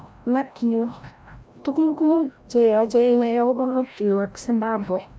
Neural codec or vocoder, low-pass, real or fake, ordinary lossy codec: codec, 16 kHz, 0.5 kbps, FreqCodec, larger model; none; fake; none